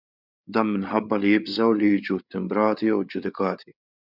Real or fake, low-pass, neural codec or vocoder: fake; 5.4 kHz; codec, 16 kHz, 8 kbps, FreqCodec, larger model